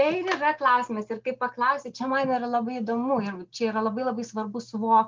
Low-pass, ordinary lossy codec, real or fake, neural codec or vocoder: 7.2 kHz; Opus, 32 kbps; real; none